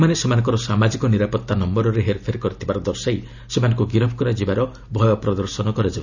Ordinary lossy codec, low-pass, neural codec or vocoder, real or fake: none; 7.2 kHz; none; real